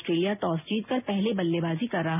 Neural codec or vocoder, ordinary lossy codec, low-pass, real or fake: none; none; 3.6 kHz; real